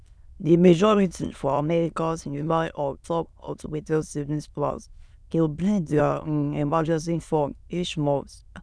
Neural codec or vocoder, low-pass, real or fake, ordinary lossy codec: autoencoder, 22.05 kHz, a latent of 192 numbers a frame, VITS, trained on many speakers; none; fake; none